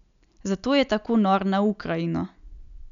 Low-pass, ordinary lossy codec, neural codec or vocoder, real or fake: 7.2 kHz; none; none; real